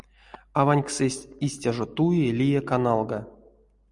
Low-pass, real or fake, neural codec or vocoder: 10.8 kHz; real; none